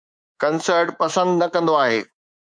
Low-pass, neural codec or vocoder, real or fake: 9.9 kHz; codec, 24 kHz, 3.1 kbps, DualCodec; fake